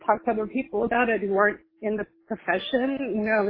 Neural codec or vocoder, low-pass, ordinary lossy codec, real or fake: none; 5.4 kHz; AAC, 32 kbps; real